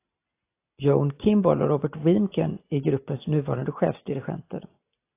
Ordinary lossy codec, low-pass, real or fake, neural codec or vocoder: AAC, 24 kbps; 3.6 kHz; real; none